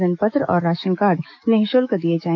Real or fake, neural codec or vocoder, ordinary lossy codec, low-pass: fake; autoencoder, 48 kHz, 128 numbers a frame, DAC-VAE, trained on Japanese speech; none; 7.2 kHz